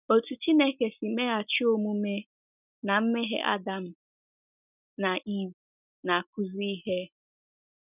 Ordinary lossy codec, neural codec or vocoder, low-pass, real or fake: none; none; 3.6 kHz; real